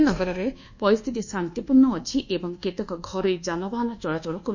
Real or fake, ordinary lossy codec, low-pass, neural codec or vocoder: fake; none; 7.2 kHz; codec, 24 kHz, 1.2 kbps, DualCodec